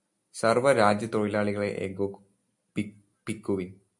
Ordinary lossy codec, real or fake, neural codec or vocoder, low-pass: MP3, 64 kbps; real; none; 10.8 kHz